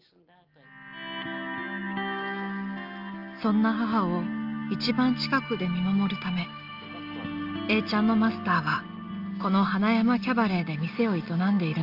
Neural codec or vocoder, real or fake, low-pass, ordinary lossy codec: none; real; 5.4 kHz; Opus, 24 kbps